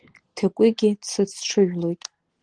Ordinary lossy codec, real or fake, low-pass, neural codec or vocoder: Opus, 16 kbps; real; 9.9 kHz; none